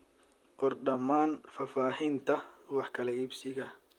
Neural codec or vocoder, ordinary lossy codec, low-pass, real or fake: vocoder, 44.1 kHz, 128 mel bands, Pupu-Vocoder; Opus, 32 kbps; 19.8 kHz; fake